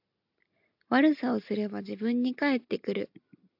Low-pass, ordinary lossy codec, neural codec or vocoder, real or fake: 5.4 kHz; AAC, 48 kbps; none; real